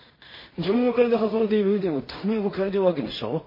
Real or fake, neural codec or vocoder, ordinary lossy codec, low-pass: fake; codec, 16 kHz in and 24 kHz out, 0.4 kbps, LongCat-Audio-Codec, two codebook decoder; MP3, 24 kbps; 5.4 kHz